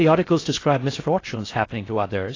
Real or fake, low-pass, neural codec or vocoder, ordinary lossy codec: fake; 7.2 kHz; codec, 16 kHz in and 24 kHz out, 0.6 kbps, FocalCodec, streaming, 2048 codes; AAC, 32 kbps